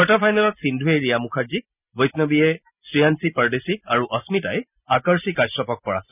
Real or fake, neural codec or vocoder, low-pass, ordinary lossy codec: real; none; 3.6 kHz; none